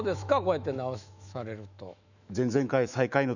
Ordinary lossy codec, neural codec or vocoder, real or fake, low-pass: none; autoencoder, 48 kHz, 128 numbers a frame, DAC-VAE, trained on Japanese speech; fake; 7.2 kHz